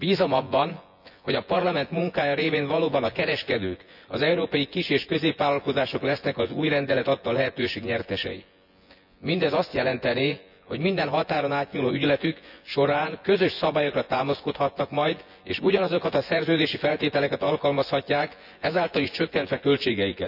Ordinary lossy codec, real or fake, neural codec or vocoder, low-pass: none; fake; vocoder, 24 kHz, 100 mel bands, Vocos; 5.4 kHz